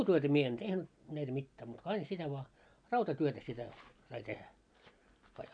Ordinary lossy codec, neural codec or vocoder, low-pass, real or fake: none; none; 9.9 kHz; real